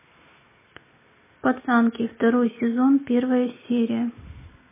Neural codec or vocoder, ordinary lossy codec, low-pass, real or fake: none; MP3, 16 kbps; 3.6 kHz; real